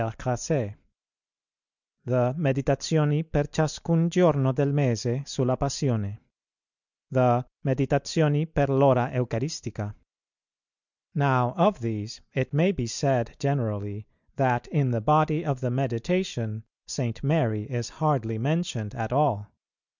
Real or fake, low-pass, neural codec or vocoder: real; 7.2 kHz; none